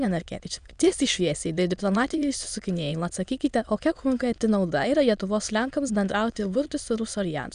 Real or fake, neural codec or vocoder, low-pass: fake; autoencoder, 22.05 kHz, a latent of 192 numbers a frame, VITS, trained on many speakers; 9.9 kHz